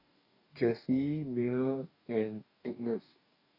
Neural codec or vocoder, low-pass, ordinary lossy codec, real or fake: codec, 44.1 kHz, 2.6 kbps, DAC; 5.4 kHz; AAC, 48 kbps; fake